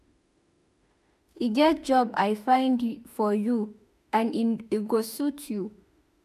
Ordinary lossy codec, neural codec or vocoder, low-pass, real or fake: none; autoencoder, 48 kHz, 32 numbers a frame, DAC-VAE, trained on Japanese speech; 14.4 kHz; fake